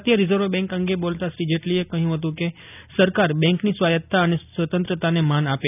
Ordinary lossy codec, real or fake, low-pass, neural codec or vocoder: none; real; 3.6 kHz; none